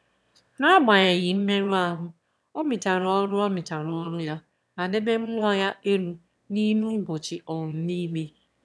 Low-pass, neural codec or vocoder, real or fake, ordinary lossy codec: none; autoencoder, 22.05 kHz, a latent of 192 numbers a frame, VITS, trained on one speaker; fake; none